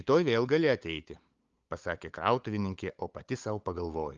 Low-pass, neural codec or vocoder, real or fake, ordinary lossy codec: 7.2 kHz; codec, 16 kHz, 8 kbps, FunCodec, trained on LibriTTS, 25 frames a second; fake; Opus, 32 kbps